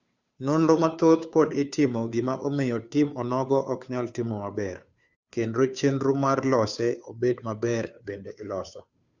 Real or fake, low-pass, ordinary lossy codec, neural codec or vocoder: fake; 7.2 kHz; Opus, 64 kbps; codec, 16 kHz, 2 kbps, FunCodec, trained on Chinese and English, 25 frames a second